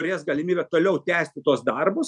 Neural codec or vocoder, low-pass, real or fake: none; 10.8 kHz; real